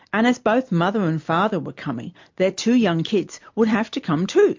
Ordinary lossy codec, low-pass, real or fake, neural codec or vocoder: MP3, 48 kbps; 7.2 kHz; real; none